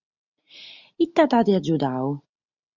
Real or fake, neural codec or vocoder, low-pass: real; none; 7.2 kHz